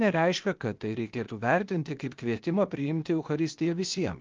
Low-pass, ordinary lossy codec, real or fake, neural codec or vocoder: 7.2 kHz; Opus, 24 kbps; fake; codec, 16 kHz, 0.8 kbps, ZipCodec